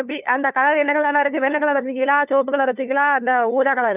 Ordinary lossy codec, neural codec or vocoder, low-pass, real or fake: none; codec, 16 kHz, 2 kbps, FunCodec, trained on LibriTTS, 25 frames a second; 3.6 kHz; fake